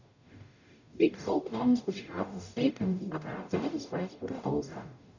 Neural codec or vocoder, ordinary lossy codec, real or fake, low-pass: codec, 44.1 kHz, 0.9 kbps, DAC; none; fake; 7.2 kHz